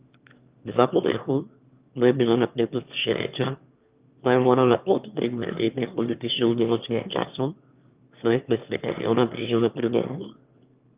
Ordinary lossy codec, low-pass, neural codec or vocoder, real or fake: Opus, 32 kbps; 3.6 kHz; autoencoder, 22.05 kHz, a latent of 192 numbers a frame, VITS, trained on one speaker; fake